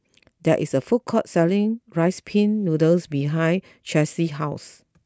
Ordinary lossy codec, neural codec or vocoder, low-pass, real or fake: none; none; none; real